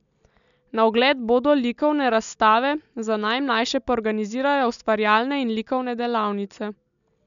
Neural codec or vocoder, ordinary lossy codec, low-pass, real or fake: none; none; 7.2 kHz; real